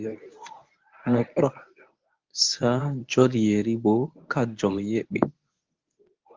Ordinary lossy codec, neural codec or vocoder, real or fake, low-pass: Opus, 24 kbps; codec, 24 kHz, 0.9 kbps, WavTokenizer, medium speech release version 1; fake; 7.2 kHz